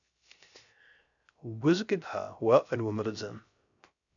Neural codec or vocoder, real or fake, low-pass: codec, 16 kHz, 0.3 kbps, FocalCodec; fake; 7.2 kHz